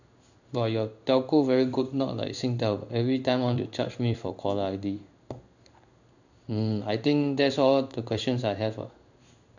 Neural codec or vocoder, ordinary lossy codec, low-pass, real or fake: codec, 16 kHz in and 24 kHz out, 1 kbps, XY-Tokenizer; none; 7.2 kHz; fake